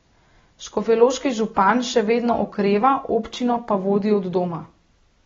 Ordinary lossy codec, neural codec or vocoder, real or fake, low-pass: AAC, 24 kbps; none; real; 7.2 kHz